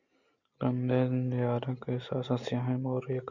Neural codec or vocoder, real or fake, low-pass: none; real; 7.2 kHz